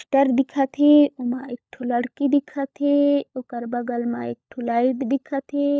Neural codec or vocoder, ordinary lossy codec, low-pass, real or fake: codec, 16 kHz, 16 kbps, FunCodec, trained on LibriTTS, 50 frames a second; none; none; fake